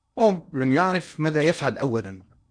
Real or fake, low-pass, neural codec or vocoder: fake; 9.9 kHz; codec, 16 kHz in and 24 kHz out, 0.8 kbps, FocalCodec, streaming, 65536 codes